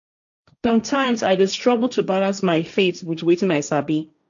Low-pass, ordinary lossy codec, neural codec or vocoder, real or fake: 7.2 kHz; none; codec, 16 kHz, 1.1 kbps, Voila-Tokenizer; fake